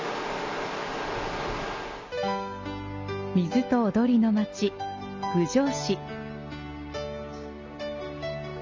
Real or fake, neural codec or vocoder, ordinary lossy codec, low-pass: real; none; MP3, 64 kbps; 7.2 kHz